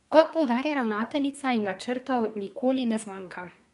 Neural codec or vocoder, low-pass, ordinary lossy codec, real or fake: codec, 24 kHz, 1 kbps, SNAC; 10.8 kHz; none; fake